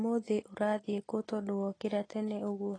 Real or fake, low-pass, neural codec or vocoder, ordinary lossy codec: real; 9.9 kHz; none; AAC, 32 kbps